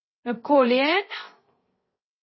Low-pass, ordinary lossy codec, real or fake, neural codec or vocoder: 7.2 kHz; MP3, 24 kbps; fake; codec, 16 kHz, 0.3 kbps, FocalCodec